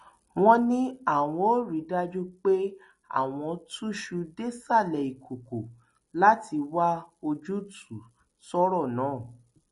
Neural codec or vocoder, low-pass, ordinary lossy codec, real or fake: none; 14.4 kHz; MP3, 48 kbps; real